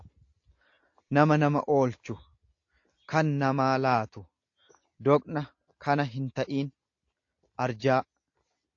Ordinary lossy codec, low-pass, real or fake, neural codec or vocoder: AAC, 48 kbps; 7.2 kHz; real; none